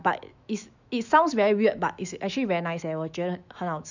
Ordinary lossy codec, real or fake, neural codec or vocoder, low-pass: none; fake; autoencoder, 48 kHz, 128 numbers a frame, DAC-VAE, trained on Japanese speech; 7.2 kHz